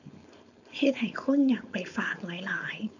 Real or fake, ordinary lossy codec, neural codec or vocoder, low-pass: fake; none; codec, 16 kHz, 4.8 kbps, FACodec; 7.2 kHz